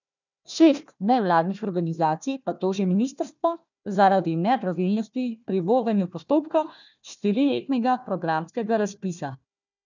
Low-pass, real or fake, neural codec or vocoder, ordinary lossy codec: 7.2 kHz; fake; codec, 16 kHz, 1 kbps, FunCodec, trained on Chinese and English, 50 frames a second; none